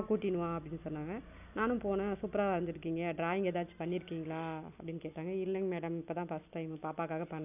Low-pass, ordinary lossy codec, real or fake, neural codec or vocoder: 3.6 kHz; none; real; none